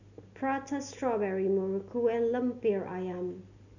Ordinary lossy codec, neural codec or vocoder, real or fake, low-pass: none; none; real; 7.2 kHz